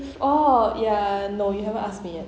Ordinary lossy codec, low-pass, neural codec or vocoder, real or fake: none; none; none; real